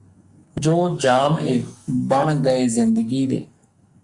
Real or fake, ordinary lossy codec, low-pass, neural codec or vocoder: fake; Opus, 64 kbps; 10.8 kHz; codec, 44.1 kHz, 2.6 kbps, SNAC